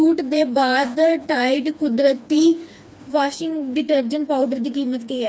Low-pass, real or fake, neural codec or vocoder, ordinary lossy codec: none; fake; codec, 16 kHz, 2 kbps, FreqCodec, smaller model; none